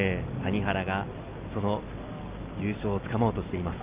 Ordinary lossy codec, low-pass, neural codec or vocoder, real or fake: Opus, 24 kbps; 3.6 kHz; none; real